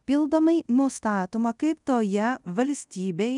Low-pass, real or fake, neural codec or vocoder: 10.8 kHz; fake; codec, 24 kHz, 0.5 kbps, DualCodec